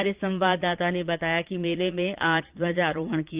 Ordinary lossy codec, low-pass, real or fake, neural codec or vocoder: Opus, 24 kbps; 3.6 kHz; fake; vocoder, 22.05 kHz, 80 mel bands, Vocos